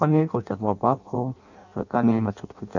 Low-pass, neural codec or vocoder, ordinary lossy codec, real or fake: 7.2 kHz; codec, 16 kHz in and 24 kHz out, 0.6 kbps, FireRedTTS-2 codec; none; fake